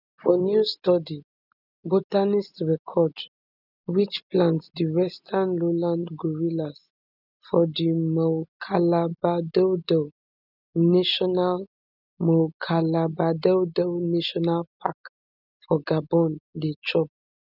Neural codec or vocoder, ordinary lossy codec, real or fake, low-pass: none; none; real; 5.4 kHz